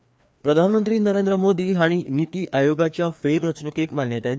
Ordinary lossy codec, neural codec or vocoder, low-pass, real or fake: none; codec, 16 kHz, 2 kbps, FreqCodec, larger model; none; fake